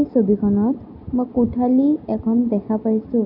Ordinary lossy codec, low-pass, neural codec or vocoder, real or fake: none; 5.4 kHz; none; real